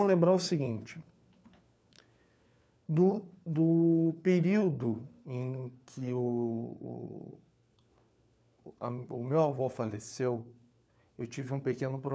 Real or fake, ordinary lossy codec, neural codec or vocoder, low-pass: fake; none; codec, 16 kHz, 4 kbps, FunCodec, trained on LibriTTS, 50 frames a second; none